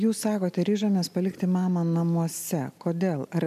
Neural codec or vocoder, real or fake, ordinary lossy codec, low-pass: none; real; MP3, 96 kbps; 14.4 kHz